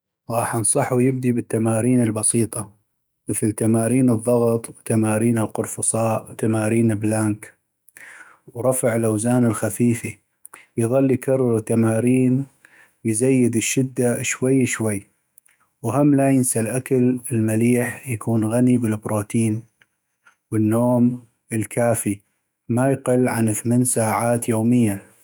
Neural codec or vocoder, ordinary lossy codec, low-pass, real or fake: autoencoder, 48 kHz, 128 numbers a frame, DAC-VAE, trained on Japanese speech; none; none; fake